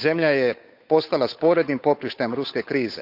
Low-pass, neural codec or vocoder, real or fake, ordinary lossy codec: 5.4 kHz; codec, 16 kHz, 8 kbps, FunCodec, trained on Chinese and English, 25 frames a second; fake; Opus, 64 kbps